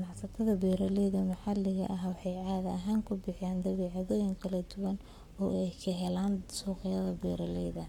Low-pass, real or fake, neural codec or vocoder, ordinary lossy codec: 19.8 kHz; fake; codec, 44.1 kHz, 7.8 kbps, Pupu-Codec; MP3, 96 kbps